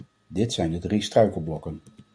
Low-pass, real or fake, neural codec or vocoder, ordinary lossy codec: 9.9 kHz; real; none; MP3, 64 kbps